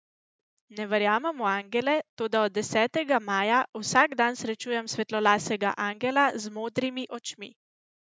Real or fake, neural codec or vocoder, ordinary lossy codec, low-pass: real; none; none; none